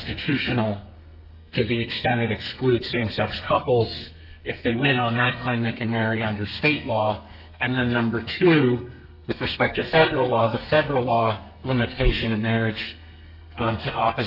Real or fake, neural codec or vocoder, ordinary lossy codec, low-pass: fake; codec, 32 kHz, 1.9 kbps, SNAC; AAC, 48 kbps; 5.4 kHz